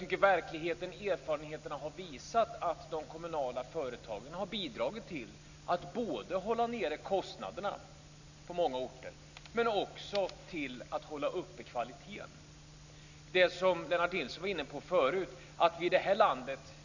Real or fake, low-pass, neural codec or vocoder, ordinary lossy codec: real; 7.2 kHz; none; none